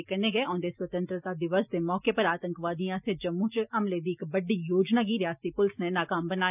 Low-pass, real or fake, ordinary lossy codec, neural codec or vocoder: 3.6 kHz; real; none; none